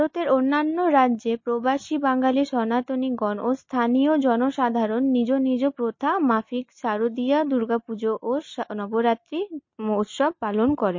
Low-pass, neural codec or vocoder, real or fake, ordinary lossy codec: 7.2 kHz; none; real; MP3, 32 kbps